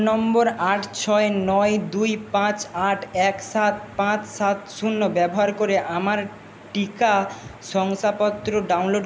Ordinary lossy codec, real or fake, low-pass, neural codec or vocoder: none; real; none; none